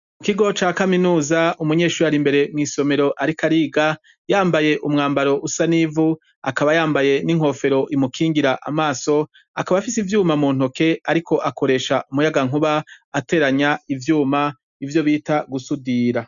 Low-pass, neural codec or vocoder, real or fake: 7.2 kHz; none; real